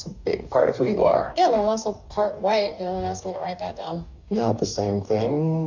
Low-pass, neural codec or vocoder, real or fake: 7.2 kHz; codec, 44.1 kHz, 2.6 kbps, DAC; fake